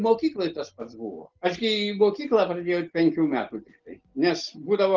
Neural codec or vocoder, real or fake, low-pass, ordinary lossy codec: none; real; 7.2 kHz; Opus, 16 kbps